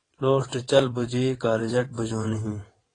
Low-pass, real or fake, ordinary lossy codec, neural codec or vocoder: 9.9 kHz; fake; AAC, 32 kbps; vocoder, 22.05 kHz, 80 mel bands, WaveNeXt